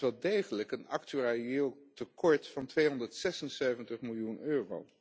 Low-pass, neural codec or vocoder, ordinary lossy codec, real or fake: none; none; none; real